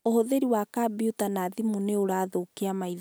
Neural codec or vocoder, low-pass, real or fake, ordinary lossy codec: none; none; real; none